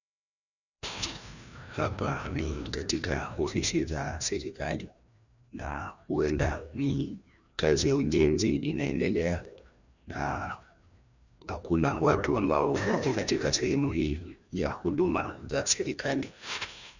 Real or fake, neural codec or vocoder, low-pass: fake; codec, 16 kHz, 1 kbps, FreqCodec, larger model; 7.2 kHz